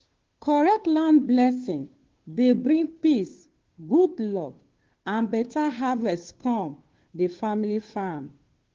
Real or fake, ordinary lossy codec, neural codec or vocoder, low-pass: fake; Opus, 16 kbps; codec, 16 kHz, 2 kbps, FunCodec, trained on Chinese and English, 25 frames a second; 7.2 kHz